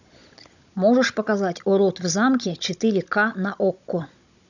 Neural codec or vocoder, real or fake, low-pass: codec, 16 kHz, 16 kbps, FunCodec, trained on Chinese and English, 50 frames a second; fake; 7.2 kHz